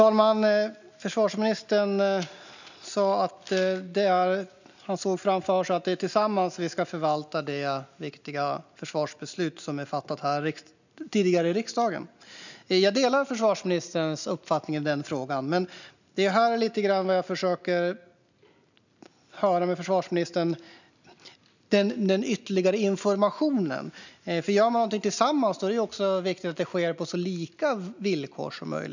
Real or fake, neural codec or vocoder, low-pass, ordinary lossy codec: real; none; 7.2 kHz; none